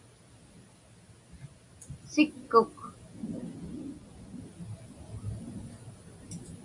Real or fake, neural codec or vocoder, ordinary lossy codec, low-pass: real; none; MP3, 48 kbps; 10.8 kHz